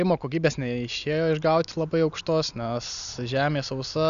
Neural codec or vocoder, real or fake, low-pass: none; real; 7.2 kHz